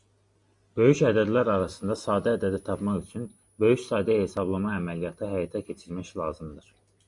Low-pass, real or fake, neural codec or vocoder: 10.8 kHz; real; none